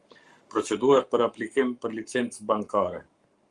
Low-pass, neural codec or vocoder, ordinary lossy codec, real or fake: 10.8 kHz; codec, 44.1 kHz, 7.8 kbps, Pupu-Codec; Opus, 32 kbps; fake